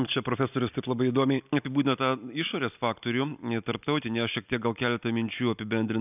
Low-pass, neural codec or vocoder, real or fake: 3.6 kHz; none; real